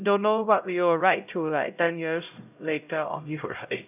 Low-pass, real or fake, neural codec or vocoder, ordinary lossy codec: 3.6 kHz; fake; codec, 16 kHz, 0.5 kbps, X-Codec, HuBERT features, trained on LibriSpeech; none